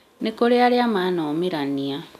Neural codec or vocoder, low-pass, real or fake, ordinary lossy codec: none; 14.4 kHz; real; none